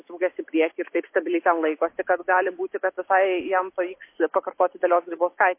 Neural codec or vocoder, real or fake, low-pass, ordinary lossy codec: none; real; 3.6 kHz; MP3, 24 kbps